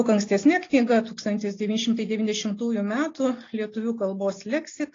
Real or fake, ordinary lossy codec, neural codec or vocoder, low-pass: real; AAC, 32 kbps; none; 7.2 kHz